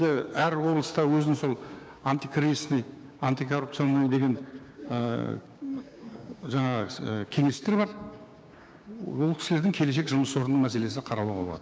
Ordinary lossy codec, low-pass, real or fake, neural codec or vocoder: none; none; fake; codec, 16 kHz, 6 kbps, DAC